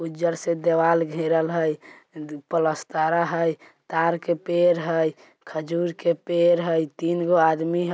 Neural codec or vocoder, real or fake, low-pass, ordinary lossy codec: none; real; none; none